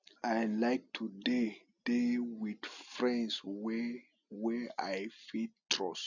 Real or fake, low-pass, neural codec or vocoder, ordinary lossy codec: real; 7.2 kHz; none; none